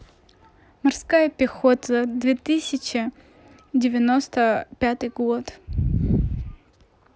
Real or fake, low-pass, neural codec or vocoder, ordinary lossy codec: real; none; none; none